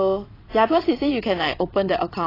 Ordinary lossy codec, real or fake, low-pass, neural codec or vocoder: AAC, 24 kbps; fake; 5.4 kHz; vocoder, 22.05 kHz, 80 mel bands, WaveNeXt